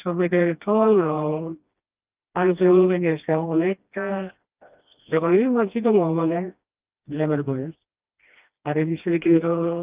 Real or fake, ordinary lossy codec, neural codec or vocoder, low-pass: fake; Opus, 24 kbps; codec, 16 kHz, 1 kbps, FreqCodec, smaller model; 3.6 kHz